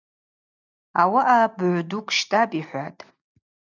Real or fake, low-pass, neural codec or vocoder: real; 7.2 kHz; none